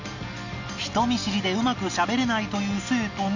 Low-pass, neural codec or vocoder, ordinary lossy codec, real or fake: 7.2 kHz; none; none; real